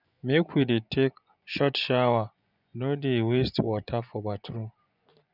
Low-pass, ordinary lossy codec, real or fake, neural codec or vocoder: 5.4 kHz; none; fake; vocoder, 24 kHz, 100 mel bands, Vocos